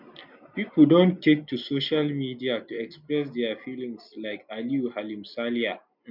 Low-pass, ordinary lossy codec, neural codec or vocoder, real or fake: 5.4 kHz; none; none; real